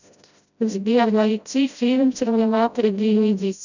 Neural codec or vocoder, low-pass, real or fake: codec, 16 kHz, 0.5 kbps, FreqCodec, smaller model; 7.2 kHz; fake